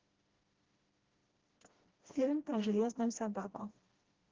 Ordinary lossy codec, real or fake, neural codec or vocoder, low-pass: Opus, 16 kbps; fake; codec, 16 kHz, 1 kbps, FreqCodec, smaller model; 7.2 kHz